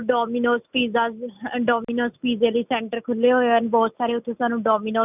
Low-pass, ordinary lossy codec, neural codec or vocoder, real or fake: 3.6 kHz; none; none; real